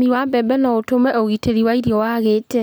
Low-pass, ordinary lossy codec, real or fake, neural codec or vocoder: none; none; real; none